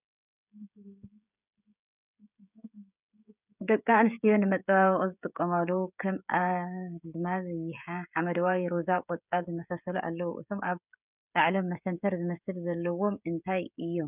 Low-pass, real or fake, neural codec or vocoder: 3.6 kHz; fake; codec, 16 kHz, 16 kbps, FreqCodec, smaller model